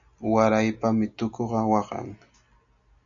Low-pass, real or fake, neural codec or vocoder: 7.2 kHz; real; none